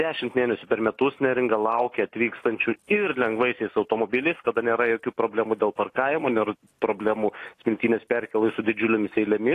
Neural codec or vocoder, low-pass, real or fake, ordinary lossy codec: none; 14.4 kHz; real; AAC, 48 kbps